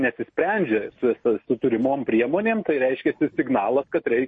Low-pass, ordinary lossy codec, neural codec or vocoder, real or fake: 10.8 kHz; MP3, 32 kbps; none; real